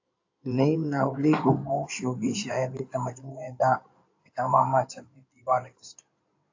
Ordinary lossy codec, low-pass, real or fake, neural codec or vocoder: AAC, 48 kbps; 7.2 kHz; fake; codec, 16 kHz in and 24 kHz out, 2.2 kbps, FireRedTTS-2 codec